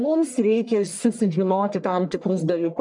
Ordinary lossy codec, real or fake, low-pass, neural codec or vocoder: MP3, 96 kbps; fake; 10.8 kHz; codec, 44.1 kHz, 1.7 kbps, Pupu-Codec